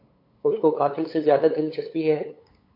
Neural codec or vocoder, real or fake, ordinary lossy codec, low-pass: codec, 16 kHz, 8 kbps, FunCodec, trained on LibriTTS, 25 frames a second; fake; AAC, 32 kbps; 5.4 kHz